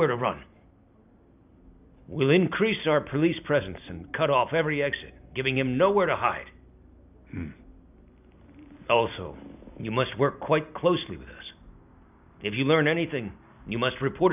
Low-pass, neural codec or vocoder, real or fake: 3.6 kHz; none; real